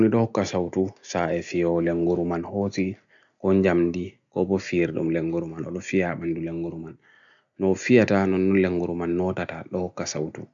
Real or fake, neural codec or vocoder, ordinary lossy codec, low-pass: real; none; none; 7.2 kHz